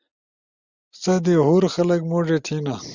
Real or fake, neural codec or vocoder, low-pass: real; none; 7.2 kHz